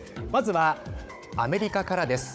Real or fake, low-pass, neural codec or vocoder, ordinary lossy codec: fake; none; codec, 16 kHz, 16 kbps, FunCodec, trained on Chinese and English, 50 frames a second; none